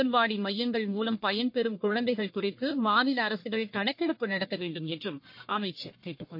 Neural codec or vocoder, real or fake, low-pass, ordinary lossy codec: codec, 44.1 kHz, 1.7 kbps, Pupu-Codec; fake; 5.4 kHz; MP3, 32 kbps